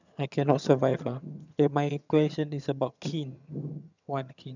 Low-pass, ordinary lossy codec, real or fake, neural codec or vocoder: 7.2 kHz; none; fake; vocoder, 22.05 kHz, 80 mel bands, HiFi-GAN